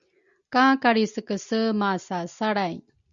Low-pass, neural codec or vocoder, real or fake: 7.2 kHz; none; real